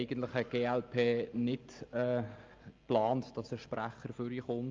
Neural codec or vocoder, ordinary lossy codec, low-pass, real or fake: none; Opus, 24 kbps; 7.2 kHz; real